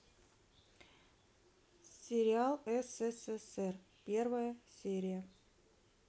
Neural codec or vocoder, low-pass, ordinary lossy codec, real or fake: none; none; none; real